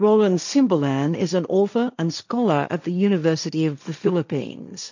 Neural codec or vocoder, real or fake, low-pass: codec, 16 kHz, 1.1 kbps, Voila-Tokenizer; fake; 7.2 kHz